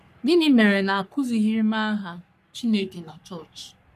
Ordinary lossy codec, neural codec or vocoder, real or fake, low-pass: none; codec, 44.1 kHz, 3.4 kbps, Pupu-Codec; fake; 14.4 kHz